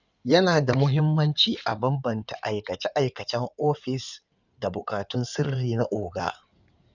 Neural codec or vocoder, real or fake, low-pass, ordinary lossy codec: codec, 16 kHz in and 24 kHz out, 2.2 kbps, FireRedTTS-2 codec; fake; 7.2 kHz; none